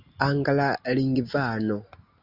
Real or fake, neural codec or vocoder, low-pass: real; none; 5.4 kHz